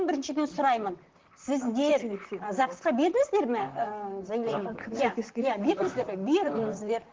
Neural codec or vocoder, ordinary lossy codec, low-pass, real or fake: vocoder, 44.1 kHz, 128 mel bands, Pupu-Vocoder; Opus, 16 kbps; 7.2 kHz; fake